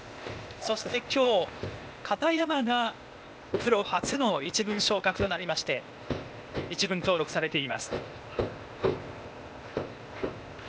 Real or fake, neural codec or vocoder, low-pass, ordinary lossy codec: fake; codec, 16 kHz, 0.8 kbps, ZipCodec; none; none